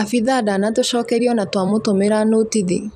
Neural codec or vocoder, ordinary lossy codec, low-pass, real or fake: vocoder, 44.1 kHz, 128 mel bands every 256 samples, BigVGAN v2; none; 14.4 kHz; fake